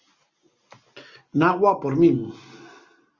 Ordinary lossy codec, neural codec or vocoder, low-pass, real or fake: Opus, 64 kbps; none; 7.2 kHz; real